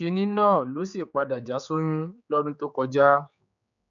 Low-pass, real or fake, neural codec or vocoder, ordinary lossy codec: 7.2 kHz; fake; codec, 16 kHz, 4 kbps, X-Codec, HuBERT features, trained on general audio; none